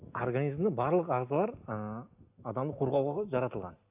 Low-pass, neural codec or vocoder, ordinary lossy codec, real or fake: 3.6 kHz; none; none; real